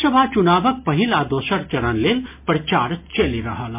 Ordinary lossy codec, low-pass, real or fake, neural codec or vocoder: MP3, 32 kbps; 3.6 kHz; real; none